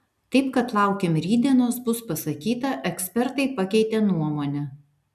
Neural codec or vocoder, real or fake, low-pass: none; real; 14.4 kHz